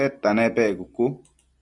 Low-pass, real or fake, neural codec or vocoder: 10.8 kHz; real; none